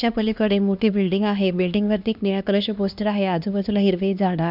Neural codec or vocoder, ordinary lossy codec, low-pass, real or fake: codec, 16 kHz, 2 kbps, X-Codec, HuBERT features, trained on LibriSpeech; none; 5.4 kHz; fake